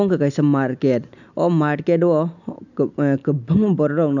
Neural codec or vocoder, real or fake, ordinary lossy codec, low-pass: none; real; none; 7.2 kHz